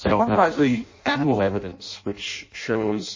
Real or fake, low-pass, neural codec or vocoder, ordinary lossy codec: fake; 7.2 kHz; codec, 16 kHz in and 24 kHz out, 0.6 kbps, FireRedTTS-2 codec; MP3, 32 kbps